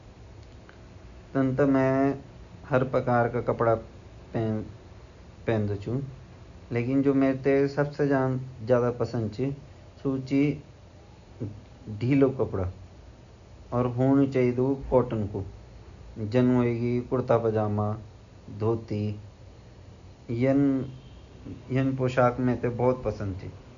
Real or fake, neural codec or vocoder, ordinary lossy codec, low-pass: real; none; none; 7.2 kHz